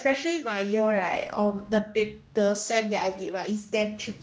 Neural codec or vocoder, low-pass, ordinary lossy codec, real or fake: codec, 16 kHz, 1 kbps, X-Codec, HuBERT features, trained on general audio; none; none; fake